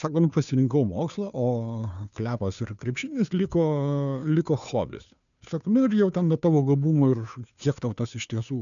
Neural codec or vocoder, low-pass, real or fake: codec, 16 kHz, 2 kbps, FunCodec, trained on Chinese and English, 25 frames a second; 7.2 kHz; fake